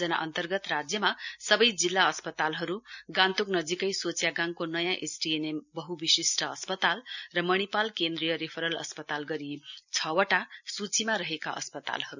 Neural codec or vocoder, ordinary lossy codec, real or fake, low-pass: none; none; real; 7.2 kHz